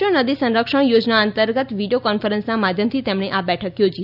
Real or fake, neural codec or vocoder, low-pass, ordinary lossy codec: real; none; 5.4 kHz; none